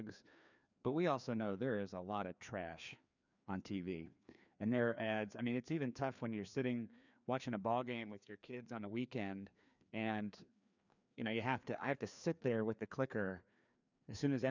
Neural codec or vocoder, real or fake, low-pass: codec, 16 kHz, 2 kbps, FreqCodec, larger model; fake; 7.2 kHz